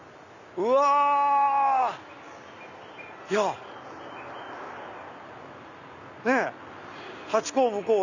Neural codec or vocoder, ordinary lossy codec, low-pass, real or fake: none; none; 7.2 kHz; real